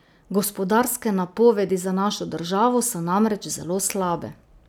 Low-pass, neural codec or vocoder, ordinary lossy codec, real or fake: none; none; none; real